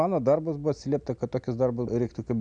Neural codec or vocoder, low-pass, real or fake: none; 9.9 kHz; real